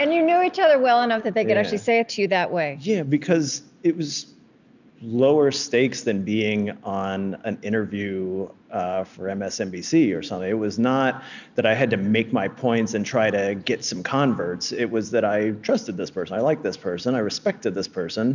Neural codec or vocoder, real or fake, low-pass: none; real; 7.2 kHz